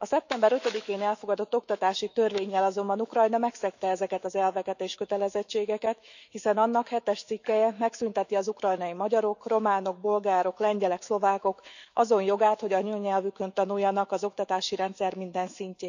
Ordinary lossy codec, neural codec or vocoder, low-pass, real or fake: none; autoencoder, 48 kHz, 128 numbers a frame, DAC-VAE, trained on Japanese speech; 7.2 kHz; fake